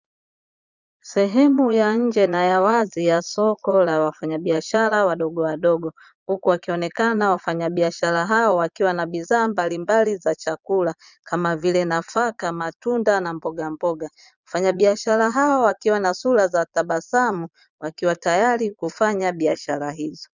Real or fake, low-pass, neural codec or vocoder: fake; 7.2 kHz; vocoder, 22.05 kHz, 80 mel bands, Vocos